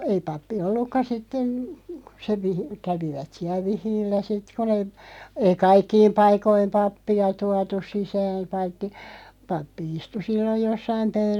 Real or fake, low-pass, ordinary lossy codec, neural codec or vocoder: real; 19.8 kHz; none; none